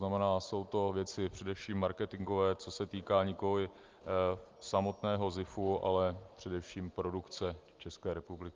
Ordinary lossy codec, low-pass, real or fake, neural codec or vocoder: Opus, 32 kbps; 7.2 kHz; real; none